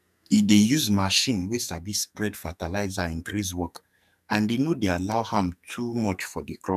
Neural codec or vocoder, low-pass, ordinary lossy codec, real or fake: codec, 44.1 kHz, 2.6 kbps, SNAC; 14.4 kHz; none; fake